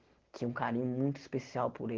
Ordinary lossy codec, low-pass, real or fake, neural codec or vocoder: Opus, 16 kbps; 7.2 kHz; real; none